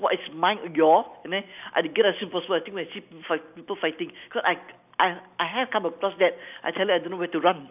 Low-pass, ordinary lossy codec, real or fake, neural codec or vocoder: 3.6 kHz; none; real; none